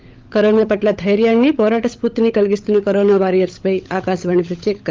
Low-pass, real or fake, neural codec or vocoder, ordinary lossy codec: 7.2 kHz; fake; codec, 16 kHz, 16 kbps, FunCodec, trained on LibriTTS, 50 frames a second; Opus, 32 kbps